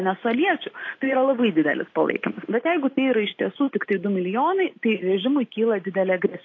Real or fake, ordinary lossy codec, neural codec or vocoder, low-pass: real; AAC, 32 kbps; none; 7.2 kHz